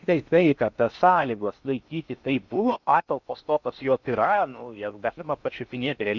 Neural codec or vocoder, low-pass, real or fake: codec, 16 kHz in and 24 kHz out, 0.6 kbps, FocalCodec, streaming, 4096 codes; 7.2 kHz; fake